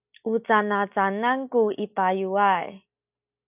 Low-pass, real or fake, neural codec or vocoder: 3.6 kHz; real; none